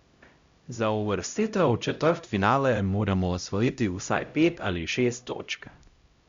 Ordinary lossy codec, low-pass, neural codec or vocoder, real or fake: Opus, 64 kbps; 7.2 kHz; codec, 16 kHz, 0.5 kbps, X-Codec, HuBERT features, trained on LibriSpeech; fake